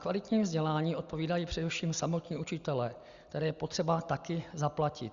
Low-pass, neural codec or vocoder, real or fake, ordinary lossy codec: 7.2 kHz; none; real; Opus, 64 kbps